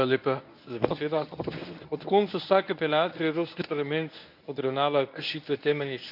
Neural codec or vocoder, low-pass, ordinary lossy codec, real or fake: codec, 24 kHz, 0.9 kbps, WavTokenizer, medium speech release version 1; 5.4 kHz; none; fake